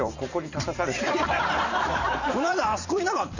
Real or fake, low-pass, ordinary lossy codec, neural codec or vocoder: fake; 7.2 kHz; none; vocoder, 44.1 kHz, 128 mel bands every 512 samples, BigVGAN v2